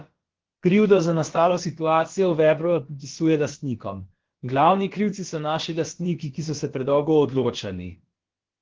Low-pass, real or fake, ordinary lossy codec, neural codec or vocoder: 7.2 kHz; fake; Opus, 16 kbps; codec, 16 kHz, about 1 kbps, DyCAST, with the encoder's durations